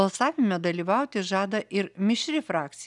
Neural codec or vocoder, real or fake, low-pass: none; real; 10.8 kHz